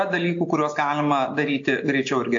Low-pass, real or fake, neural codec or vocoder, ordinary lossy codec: 7.2 kHz; real; none; AAC, 48 kbps